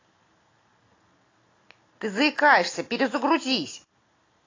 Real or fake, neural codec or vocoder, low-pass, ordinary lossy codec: real; none; 7.2 kHz; AAC, 32 kbps